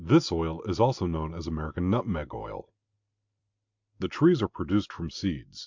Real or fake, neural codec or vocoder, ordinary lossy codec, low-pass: real; none; MP3, 64 kbps; 7.2 kHz